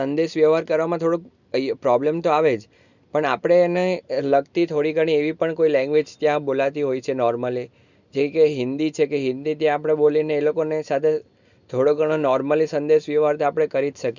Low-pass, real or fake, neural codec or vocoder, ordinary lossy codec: 7.2 kHz; real; none; none